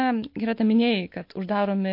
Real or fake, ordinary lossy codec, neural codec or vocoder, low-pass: real; MP3, 32 kbps; none; 5.4 kHz